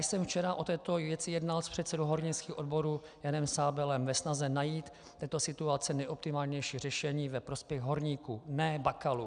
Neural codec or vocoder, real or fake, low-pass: none; real; 9.9 kHz